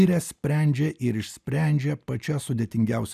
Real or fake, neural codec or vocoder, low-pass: real; none; 14.4 kHz